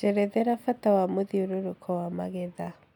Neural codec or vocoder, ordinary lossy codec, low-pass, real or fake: none; none; 19.8 kHz; real